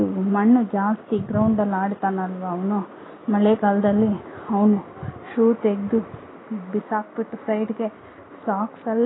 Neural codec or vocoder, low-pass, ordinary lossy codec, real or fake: none; 7.2 kHz; AAC, 16 kbps; real